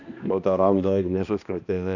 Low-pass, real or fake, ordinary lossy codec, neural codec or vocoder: 7.2 kHz; fake; none; codec, 16 kHz, 1 kbps, X-Codec, HuBERT features, trained on balanced general audio